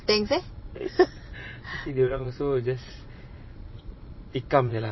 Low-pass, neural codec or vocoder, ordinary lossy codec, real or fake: 7.2 kHz; vocoder, 22.05 kHz, 80 mel bands, Vocos; MP3, 24 kbps; fake